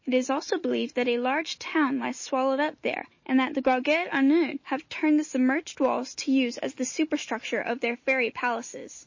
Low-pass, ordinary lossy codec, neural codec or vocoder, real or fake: 7.2 kHz; MP3, 32 kbps; none; real